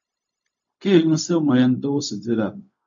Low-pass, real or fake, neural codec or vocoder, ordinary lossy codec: 7.2 kHz; fake; codec, 16 kHz, 0.4 kbps, LongCat-Audio-Codec; AAC, 64 kbps